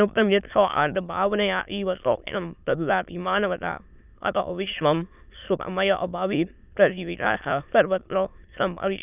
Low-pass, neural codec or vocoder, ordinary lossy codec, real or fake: 3.6 kHz; autoencoder, 22.05 kHz, a latent of 192 numbers a frame, VITS, trained on many speakers; none; fake